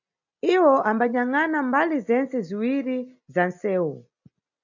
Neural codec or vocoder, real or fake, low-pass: none; real; 7.2 kHz